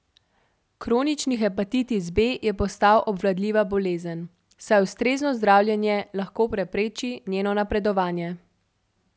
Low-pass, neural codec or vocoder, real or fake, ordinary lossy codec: none; none; real; none